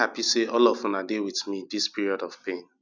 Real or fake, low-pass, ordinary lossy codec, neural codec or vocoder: real; 7.2 kHz; none; none